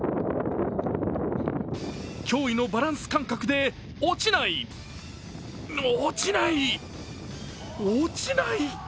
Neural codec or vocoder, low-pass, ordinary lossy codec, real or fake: none; none; none; real